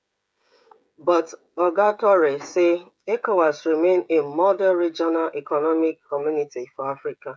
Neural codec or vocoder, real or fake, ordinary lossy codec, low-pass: codec, 16 kHz, 16 kbps, FreqCodec, smaller model; fake; none; none